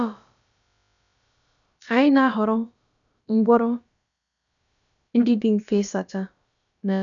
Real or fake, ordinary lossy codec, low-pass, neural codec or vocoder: fake; none; 7.2 kHz; codec, 16 kHz, about 1 kbps, DyCAST, with the encoder's durations